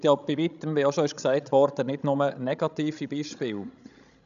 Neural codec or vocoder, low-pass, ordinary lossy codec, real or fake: codec, 16 kHz, 16 kbps, FreqCodec, larger model; 7.2 kHz; none; fake